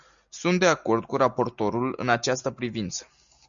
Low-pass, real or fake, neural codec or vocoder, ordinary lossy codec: 7.2 kHz; real; none; MP3, 96 kbps